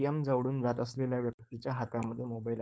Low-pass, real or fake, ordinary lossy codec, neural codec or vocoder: none; fake; none; codec, 16 kHz, 4.8 kbps, FACodec